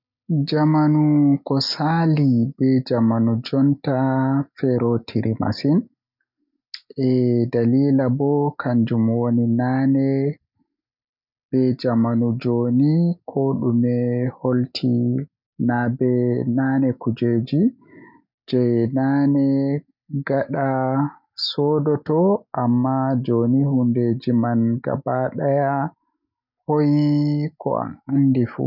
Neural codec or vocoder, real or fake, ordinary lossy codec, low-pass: none; real; none; 5.4 kHz